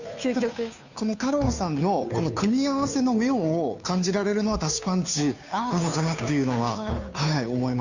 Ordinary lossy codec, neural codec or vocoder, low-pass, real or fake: none; codec, 16 kHz, 2 kbps, FunCodec, trained on Chinese and English, 25 frames a second; 7.2 kHz; fake